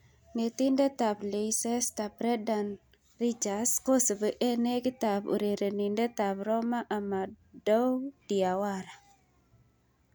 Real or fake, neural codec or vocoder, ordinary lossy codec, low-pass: real; none; none; none